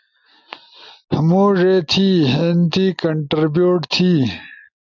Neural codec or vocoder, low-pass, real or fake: none; 7.2 kHz; real